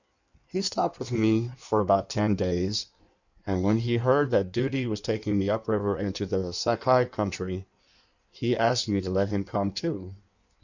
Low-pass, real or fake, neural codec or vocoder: 7.2 kHz; fake; codec, 16 kHz in and 24 kHz out, 1.1 kbps, FireRedTTS-2 codec